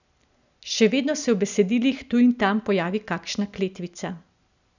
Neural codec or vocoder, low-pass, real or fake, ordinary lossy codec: none; 7.2 kHz; real; none